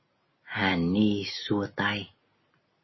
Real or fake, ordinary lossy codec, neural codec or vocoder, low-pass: real; MP3, 24 kbps; none; 7.2 kHz